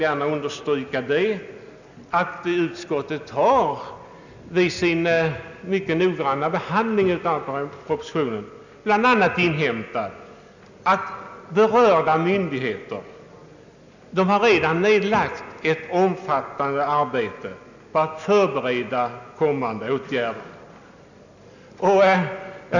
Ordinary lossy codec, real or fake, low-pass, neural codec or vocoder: none; real; 7.2 kHz; none